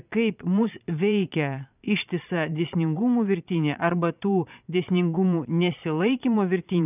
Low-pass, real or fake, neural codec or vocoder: 3.6 kHz; fake; vocoder, 22.05 kHz, 80 mel bands, Vocos